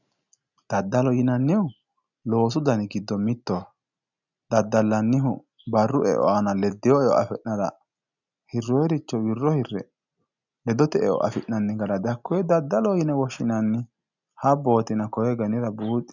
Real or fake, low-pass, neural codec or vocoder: real; 7.2 kHz; none